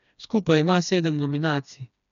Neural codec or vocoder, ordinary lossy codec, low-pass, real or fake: codec, 16 kHz, 2 kbps, FreqCodec, smaller model; none; 7.2 kHz; fake